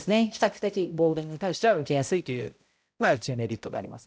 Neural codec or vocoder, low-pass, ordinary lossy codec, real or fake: codec, 16 kHz, 0.5 kbps, X-Codec, HuBERT features, trained on balanced general audio; none; none; fake